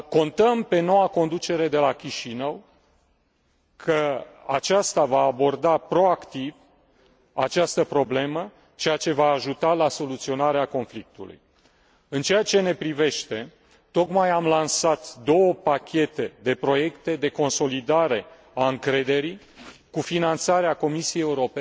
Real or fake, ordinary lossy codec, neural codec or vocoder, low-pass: real; none; none; none